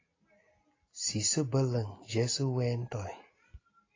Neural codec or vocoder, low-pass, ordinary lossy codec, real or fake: none; 7.2 kHz; AAC, 32 kbps; real